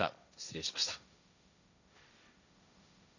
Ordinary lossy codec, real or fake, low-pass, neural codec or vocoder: none; fake; 7.2 kHz; codec, 16 kHz, 1.1 kbps, Voila-Tokenizer